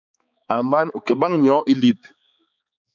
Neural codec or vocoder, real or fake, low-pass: codec, 16 kHz, 4 kbps, X-Codec, HuBERT features, trained on balanced general audio; fake; 7.2 kHz